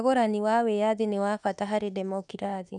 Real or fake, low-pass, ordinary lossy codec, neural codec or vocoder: fake; 10.8 kHz; none; autoencoder, 48 kHz, 32 numbers a frame, DAC-VAE, trained on Japanese speech